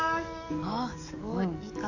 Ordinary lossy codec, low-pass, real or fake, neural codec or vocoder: none; 7.2 kHz; fake; codec, 44.1 kHz, 7.8 kbps, DAC